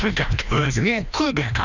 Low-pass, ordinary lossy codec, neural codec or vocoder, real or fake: 7.2 kHz; none; codec, 16 kHz, 1 kbps, FreqCodec, larger model; fake